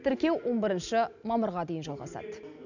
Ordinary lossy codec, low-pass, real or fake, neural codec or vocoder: none; 7.2 kHz; real; none